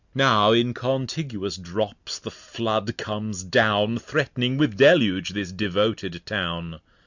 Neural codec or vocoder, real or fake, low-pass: none; real; 7.2 kHz